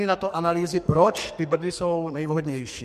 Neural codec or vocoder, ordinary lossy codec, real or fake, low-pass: codec, 44.1 kHz, 2.6 kbps, SNAC; MP3, 64 kbps; fake; 14.4 kHz